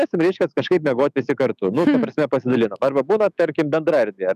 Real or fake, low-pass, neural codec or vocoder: fake; 14.4 kHz; autoencoder, 48 kHz, 128 numbers a frame, DAC-VAE, trained on Japanese speech